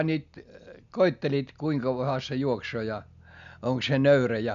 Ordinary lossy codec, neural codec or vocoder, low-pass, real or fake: none; none; 7.2 kHz; real